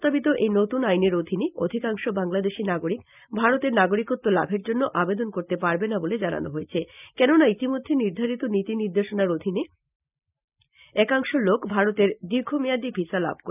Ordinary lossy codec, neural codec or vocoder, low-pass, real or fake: none; none; 3.6 kHz; real